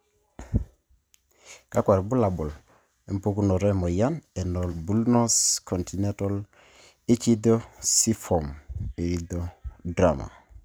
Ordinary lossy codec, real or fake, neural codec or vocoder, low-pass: none; real; none; none